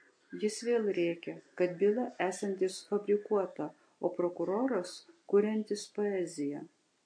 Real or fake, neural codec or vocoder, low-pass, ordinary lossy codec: real; none; 9.9 kHz; MP3, 48 kbps